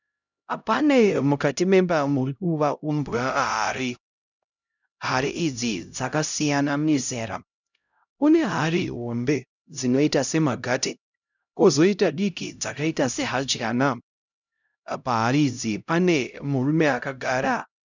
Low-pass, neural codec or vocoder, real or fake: 7.2 kHz; codec, 16 kHz, 0.5 kbps, X-Codec, HuBERT features, trained on LibriSpeech; fake